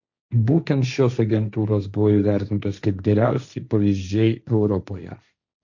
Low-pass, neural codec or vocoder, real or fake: 7.2 kHz; codec, 16 kHz, 1.1 kbps, Voila-Tokenizer; fake